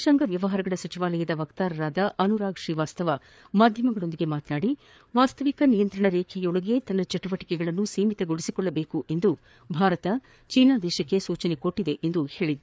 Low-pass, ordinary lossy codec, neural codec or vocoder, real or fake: none; none; codec, 16 kHz, 4 kbps, FreqCodec, larger model; fake